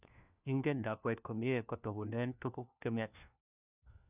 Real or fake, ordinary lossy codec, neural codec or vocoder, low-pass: fake; none; codec, 16 kHz, 1 kbps, FunCodec, trained on LibriTTS, 50 frames a second; 3.6 kHz